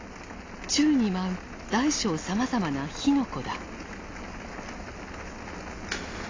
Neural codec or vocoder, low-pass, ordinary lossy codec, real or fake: none; 7.2 kHz; AAC, 32 kbps; real